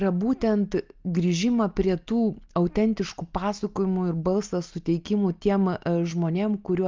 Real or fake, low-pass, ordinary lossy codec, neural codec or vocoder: real; 7.2 kHz; Opus, 32 kbps; none